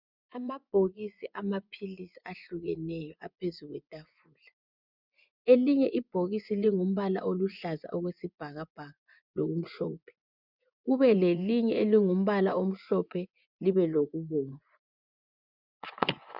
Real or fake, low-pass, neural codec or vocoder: fake; 5.4 kHz; vocoder, 24 kHz, 100 mel bands, Vocos